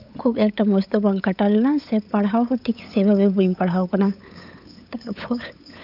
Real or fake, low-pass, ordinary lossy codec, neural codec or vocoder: fake; 5.4 kHz; none; codec, 16 kHz, 8 kbps, FunCodec, trained on LibriTTS, 25 frames a second